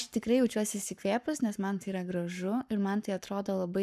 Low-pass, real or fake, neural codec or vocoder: 14.4 kHz; fake; codec, 44.1 kHz, 7.8 kbps, Pupu-Codec